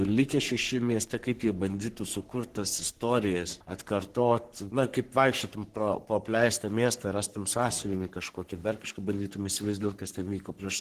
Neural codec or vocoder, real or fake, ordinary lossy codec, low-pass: codec, 44.1 kHz, 3.4 kbps, Pupu-Codec; fake; Opus, 16 kbps; 14.4 kHz